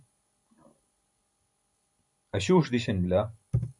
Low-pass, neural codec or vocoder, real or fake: 10.8 kHz; none; real